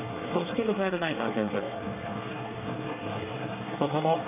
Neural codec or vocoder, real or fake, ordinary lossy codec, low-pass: codec, 24 kHz, 1 kbps, SNAC; fake; none; 3.6 kHz